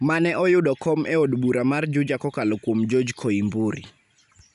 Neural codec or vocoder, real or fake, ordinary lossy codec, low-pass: none; real; none; 10.8 kHz